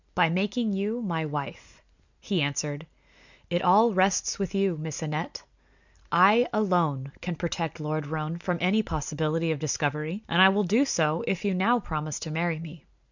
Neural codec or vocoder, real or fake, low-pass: none; real; 7.2 kHz